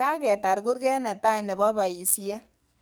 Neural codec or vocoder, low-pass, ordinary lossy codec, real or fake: codec, 44.1 kHz, 2.6 kbps, SNAC; none; none; fake